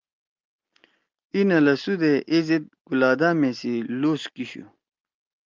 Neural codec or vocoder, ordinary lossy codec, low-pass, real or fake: none; Opus, 32 kbps; 7.2 kHz; real